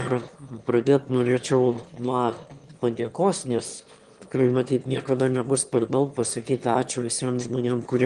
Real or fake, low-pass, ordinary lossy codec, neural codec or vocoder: fake; 9.9 kHz; Opus, 24 kbps; autoencoder, 22.05 kHz, a latent of 192 numbers a frame, VITS, trained on one speaker